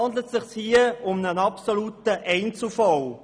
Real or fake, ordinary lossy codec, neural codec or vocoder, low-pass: real; none; none; none